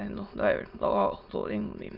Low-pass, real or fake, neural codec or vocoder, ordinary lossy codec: 5.4 kHz; fake; autoencoder, 22.05 kHz, a latent of 192 numbers a frame, VITS, trained on many speakers; Opus, 32 kbps